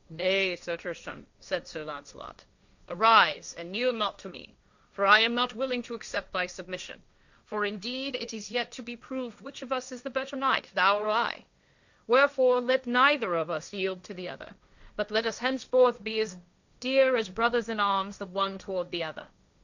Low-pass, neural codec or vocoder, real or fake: 7.2 kHz; codec, 16 kHz, 1.1 kbps, Voila-Tokenizer; fake